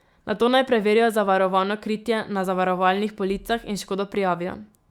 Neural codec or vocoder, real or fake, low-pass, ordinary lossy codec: autoencoder, 48 kHz, 128 numbers a frame, DAC-VAE, trained on Japanese speech; fake; 19.8 kHz; Opus, 64 kbps